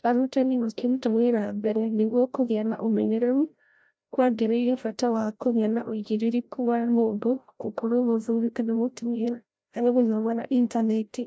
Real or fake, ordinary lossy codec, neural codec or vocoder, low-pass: fake; none; codec, 16 kHz, 0.5 kbps, FreqCodec, larger model; none